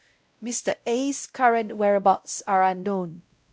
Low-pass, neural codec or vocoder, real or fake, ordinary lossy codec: none; codec, 16 kHz, 0.5 kbps, X-Codec, WavLM features, trained on Multilingual LibriSpeech; fake; none